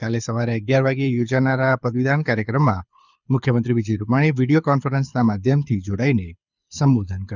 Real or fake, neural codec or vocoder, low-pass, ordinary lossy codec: fake; codec, 24 kHz, 6 kbps, HILCodec; 7.2 kHz; none